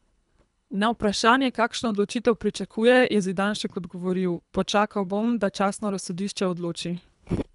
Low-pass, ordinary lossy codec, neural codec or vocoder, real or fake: 10.8 kHz; none; codec, 24 kHz, 3 kbps, HILCodec; fake